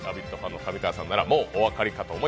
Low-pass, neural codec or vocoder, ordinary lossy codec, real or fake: none; none; none; real